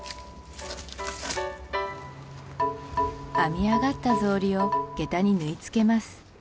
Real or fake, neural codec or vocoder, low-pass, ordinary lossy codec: real; none; none; none